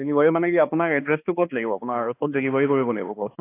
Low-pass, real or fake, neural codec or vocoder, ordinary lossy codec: 3.6 kHz; fake; codec, 16 kHz, 2 kbps, X-Codec, HuBERT features, trained on balanced general audio; AAC, 24 kbps